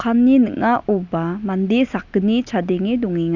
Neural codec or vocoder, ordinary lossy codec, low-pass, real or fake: none; none; 7.2 kHz; real